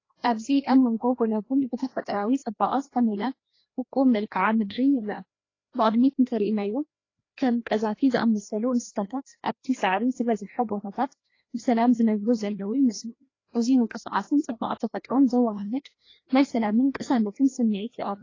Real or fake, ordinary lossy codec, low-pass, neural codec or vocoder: fake; AAC, 32 kbps; 7.2 kHz; codec, 16 kHz, 1 kbps, FreqCodec, larger model